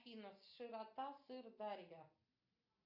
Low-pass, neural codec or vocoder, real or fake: 5.4 kHz; none; real